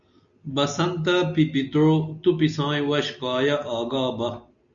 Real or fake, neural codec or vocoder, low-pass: real; none; 7.2 kHz